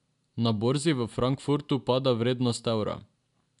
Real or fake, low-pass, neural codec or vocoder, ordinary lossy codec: real; 10.8 kHz; none; MP3, 96 kbps